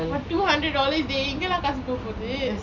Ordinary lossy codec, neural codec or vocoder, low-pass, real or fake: none; none; 7.2 kHz; real